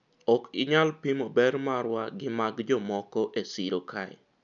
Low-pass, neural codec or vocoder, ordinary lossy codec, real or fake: 7.2 kHz; none; none; real